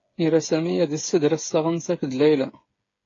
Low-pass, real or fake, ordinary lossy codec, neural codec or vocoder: 7.2 kHz; fake; AAC, 32 kbps; codec, 16 kHz, 8 kbps, FreqCodec, smaller model